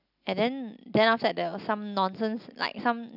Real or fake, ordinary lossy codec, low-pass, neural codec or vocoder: real; none; 5.4 kHz; none